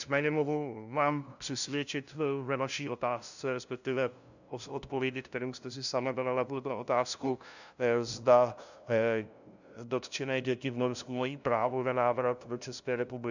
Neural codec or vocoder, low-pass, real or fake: codec, 16 kHz, 0.5 kbps, FunCodec, trained on LibriTTS, 25 frames a second; 7.2 kHz; fake